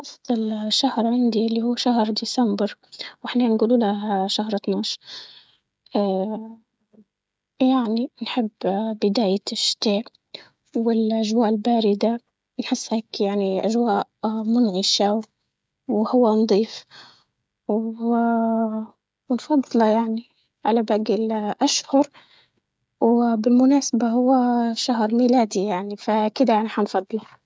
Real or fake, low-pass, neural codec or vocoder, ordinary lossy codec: fake; none; codec, 16 kHz, 16 kbps, FreqCodec, smaller model; none